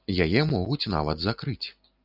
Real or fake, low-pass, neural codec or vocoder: real; 5.4 kHz; none